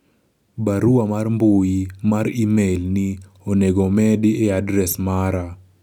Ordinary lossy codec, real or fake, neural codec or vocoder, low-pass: none; real; none; 19.8 kHz